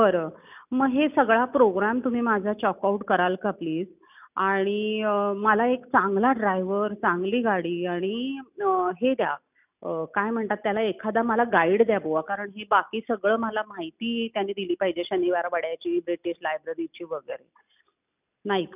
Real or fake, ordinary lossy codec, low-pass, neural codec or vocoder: real; none; 3.6 kHz; none